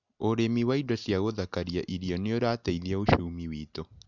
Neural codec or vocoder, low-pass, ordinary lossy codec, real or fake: none; 7.2 kHz; none; real